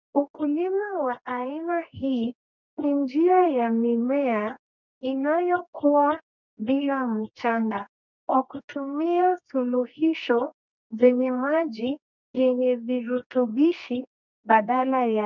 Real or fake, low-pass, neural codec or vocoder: fake; 7.2 kHz; codec, 24 kHz, 0.9 kbps, WavTokenizer, medium music audio release